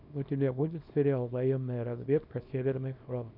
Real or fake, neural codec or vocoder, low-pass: fake; codec, 24 kHz, 0.9 kbps, WavTokenizer, small release; 5.4 kHz